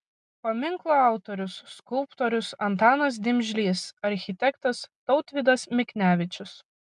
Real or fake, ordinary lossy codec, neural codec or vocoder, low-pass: real; MP3, 96 kbps; none; 10.8 kHz